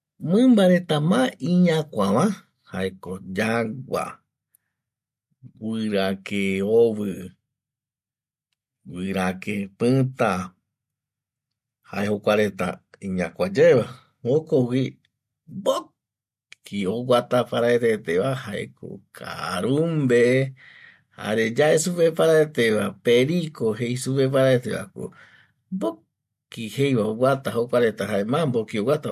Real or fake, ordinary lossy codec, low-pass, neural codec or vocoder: real; MP3, 64 kbps; 14.4 kHz; none